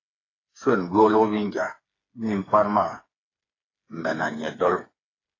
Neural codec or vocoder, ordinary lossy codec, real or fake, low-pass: codec, 16 kHz, 4 kbps, FreqCodec, smaller model; AAC, 32 kbps; fake; 7.2 kHz